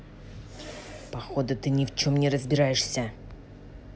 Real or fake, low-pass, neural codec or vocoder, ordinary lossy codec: real; none; none; none